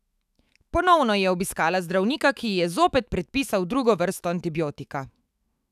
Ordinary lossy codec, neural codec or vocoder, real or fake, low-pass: none; autoencoder, 48 kHz, 128 numbers a frame, DAC-VAE, trained on Japanese speech; fake; 14.4 kHz